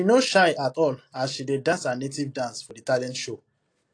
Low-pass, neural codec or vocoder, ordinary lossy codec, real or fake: 9.9 kHz; none; AAC, 48 kbps; real